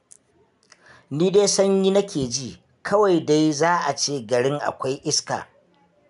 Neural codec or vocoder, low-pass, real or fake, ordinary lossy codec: none; 10.8 kHz; real; none